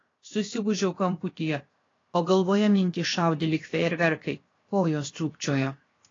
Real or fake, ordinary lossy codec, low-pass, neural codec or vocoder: fake; AAC, 32 kbps; 7.2 kHz; codec, 16 kHz, 0.7 kbps, FocalCodec